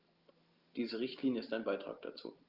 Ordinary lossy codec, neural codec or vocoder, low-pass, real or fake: Opus, 32 kbps; none; 5.4 kHz; real